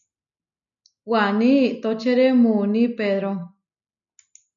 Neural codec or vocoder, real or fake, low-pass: none; real; 7.2 kHz